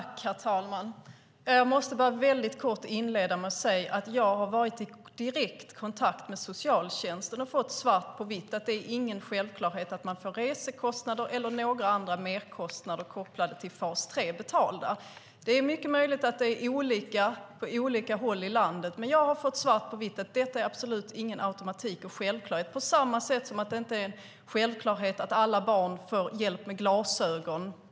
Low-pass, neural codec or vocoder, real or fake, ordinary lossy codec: none; none; real; none